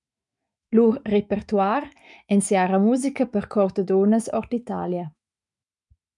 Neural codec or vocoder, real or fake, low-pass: autoencoder, 48 kHz, 128 numbers a frame, DAC-VAE, trained on Japanese speech; fake; 10.8 kHz